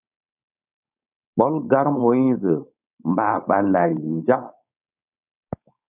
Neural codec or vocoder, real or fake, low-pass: codec, 16 kHz, 4.8 kbps, FACodec; fake; 3.6 kHz